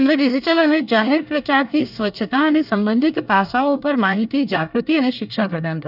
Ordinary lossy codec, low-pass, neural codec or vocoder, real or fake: none; 5.4 kHz; codec, 24 kHz, 1 kbps, SNAC; fake